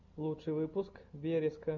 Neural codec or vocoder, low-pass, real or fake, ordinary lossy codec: none; 7.2 kHz; real; MP3, 64 kbps